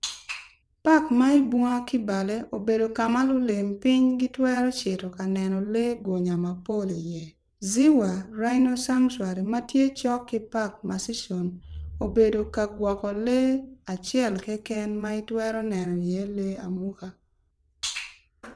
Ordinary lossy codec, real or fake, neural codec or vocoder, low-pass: none; fake; vocoder, 22.05 kHz, 80 mel bands, WaveNeXt; none